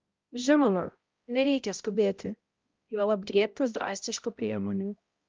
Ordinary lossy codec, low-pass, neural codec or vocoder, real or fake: Opus, 24 kbps; 7.2 kHz; codec, 16 kHz, 0.5 kbps, X-Codec, HuBERT features, trained on balanced general audio; fake